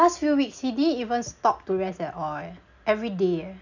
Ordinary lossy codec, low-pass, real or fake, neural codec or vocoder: none; 7.2 kHz; real; none